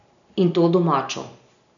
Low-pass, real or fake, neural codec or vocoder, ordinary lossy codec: 7.2 kHz; real; none; none